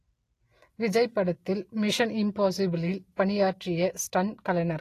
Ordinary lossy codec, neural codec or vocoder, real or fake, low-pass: AAC, 48 kbps; none; real; 14.4 kHz